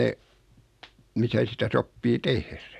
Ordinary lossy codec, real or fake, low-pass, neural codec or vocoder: none; real; 14.4 kHz; none